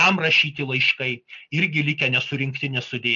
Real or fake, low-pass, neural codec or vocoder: real; 7.2 kHz; none